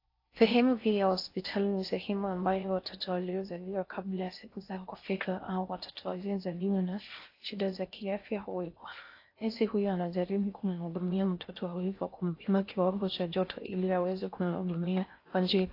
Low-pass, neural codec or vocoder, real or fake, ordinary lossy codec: 5.4 kHz; codec, 16 kHz in and 24 kHz out, 0.6 kbps, FocalCodec, streaming, 4096 codes; fake; AAC, 32 kbps